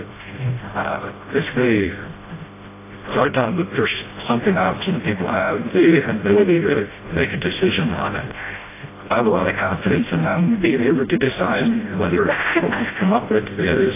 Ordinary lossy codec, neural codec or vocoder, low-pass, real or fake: AAC, 16 kbps; codec, 16 kHz, 0.5 kbps, FreqCodec, smaller model; 3.6 kHz; fake